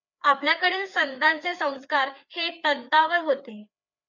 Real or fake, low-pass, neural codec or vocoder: fake; 7.2 kHz; codec, 16 kHz, 4 kbps, FreqCodec, larger model